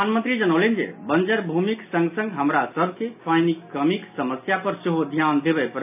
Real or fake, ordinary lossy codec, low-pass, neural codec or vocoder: real; AAC, 32 kbps; 3.6 kHz; none